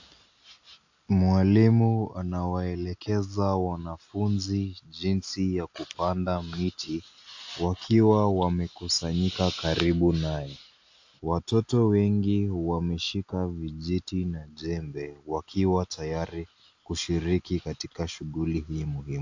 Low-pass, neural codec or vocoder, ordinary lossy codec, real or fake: 7.2 kHz; none; AAC, 48 kbps; real